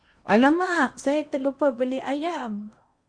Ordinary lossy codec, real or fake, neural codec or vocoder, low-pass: Opus, 64 kbps; fake; codec, 16 kHz in and 24 kHz out, 0.8 kbps, FocalCodec, streaming, 65536 codes; 9.9 kHz